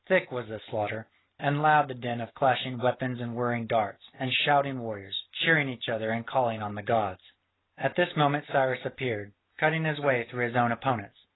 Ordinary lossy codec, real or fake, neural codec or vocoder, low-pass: AAC, 16 kbps; real; none; 7.2 kHz